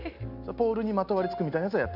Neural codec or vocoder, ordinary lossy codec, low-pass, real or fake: none; none; 5.4 kHz; real